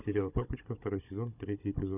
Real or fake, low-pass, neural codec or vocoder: fake; 3.6 kHz; codec, 16 kHz, 16 kbps, FunCodec, trained on Chinese and English, 50 frames a second